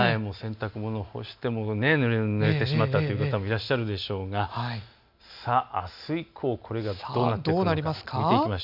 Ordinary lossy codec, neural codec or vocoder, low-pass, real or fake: MP3, 48 kbps; none; 5.4 kHz; real